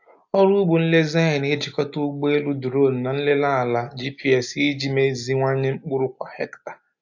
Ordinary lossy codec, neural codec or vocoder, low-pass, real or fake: AAC, 48 kbps; none; 7.2 kHz; real